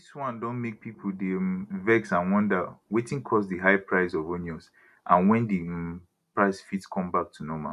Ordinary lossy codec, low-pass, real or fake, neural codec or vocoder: none; 14.4 kHz; real; none